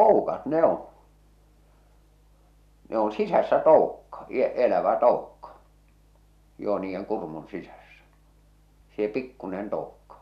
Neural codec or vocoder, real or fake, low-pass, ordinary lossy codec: none; real; 14.4 kHz; none